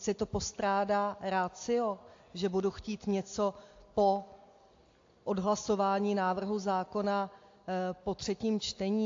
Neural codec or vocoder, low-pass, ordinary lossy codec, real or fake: none; 7.2 kHz; AAC, 48 kbps; real